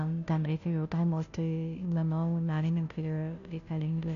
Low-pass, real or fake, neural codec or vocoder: 7.2 kHz; fake; codec, 16 kHz, 0.5 kbps, FunCodec, trained on Chinese and English, 25 frames a second